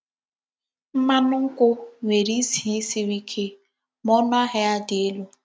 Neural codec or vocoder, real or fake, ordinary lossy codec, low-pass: none; real; none; none